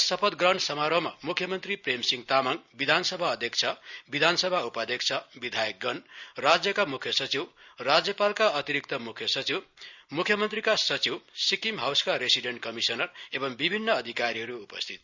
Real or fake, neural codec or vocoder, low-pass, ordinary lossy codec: real; none; 7.2 kHz; Opus, 64 kbps